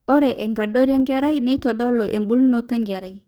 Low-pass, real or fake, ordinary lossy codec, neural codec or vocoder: none; fake; none; codec, 44.1 kHz, 2.6 kbps, DAC